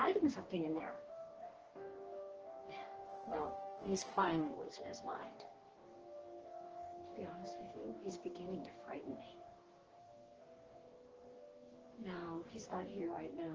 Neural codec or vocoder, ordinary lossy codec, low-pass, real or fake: codec, 44.1 kHz, 2.6 kbps, DAC; Opus, 16 kbps; 7.2 kHz; fake